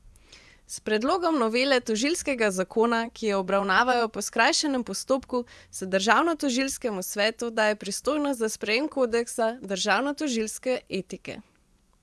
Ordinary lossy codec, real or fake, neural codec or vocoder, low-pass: none; fake; vocoder, 24 kHz, 100 mel bands, Vocos; none